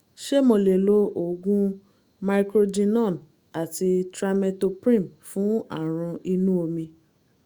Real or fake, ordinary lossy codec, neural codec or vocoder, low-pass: fake; Opus, 64 kbps; autoencoder, 48 kHz, 128 numbers a frame, DAC-VAE, trained on Japanese speech; 19.8 kHz